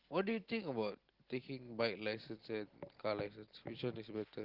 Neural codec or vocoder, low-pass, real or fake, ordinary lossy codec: none; 5.4 kHz; real; Opus, 16 kbps